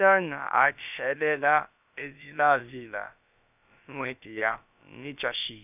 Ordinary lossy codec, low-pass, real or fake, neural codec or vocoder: none; 3.6 kHz; fake; codec, 16 kHz, about 1 kbps, DyCAST, with the encoder's durations